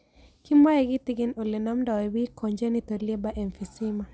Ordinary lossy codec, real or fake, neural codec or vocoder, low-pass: none; real; none; none